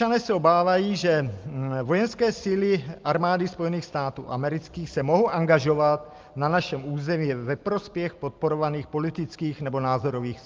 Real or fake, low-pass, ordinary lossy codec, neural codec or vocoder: real; 7.2 kHz; Opus, 24 kbps; none